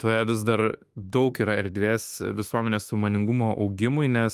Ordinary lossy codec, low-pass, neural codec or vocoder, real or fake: Opus, 32 kbps; 14.4 kHz; autoencoder, 48 kHz, 32 numbers a frame, DAC-VAE, trained on Japanese speech; fake